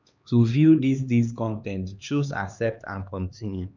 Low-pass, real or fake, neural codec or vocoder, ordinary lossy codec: 7.2 kHz; fake; codec, 16 kHz, 2 kbps, X-Codec, HuBERT features, trained on LibriSpeech; none